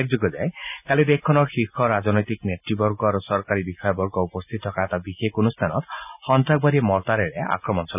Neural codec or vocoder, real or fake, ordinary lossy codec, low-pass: none; real; none; 3.6 kHz